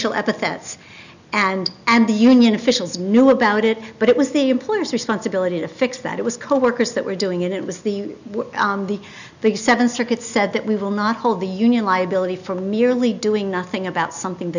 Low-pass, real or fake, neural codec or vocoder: 7.2 kHz; real; none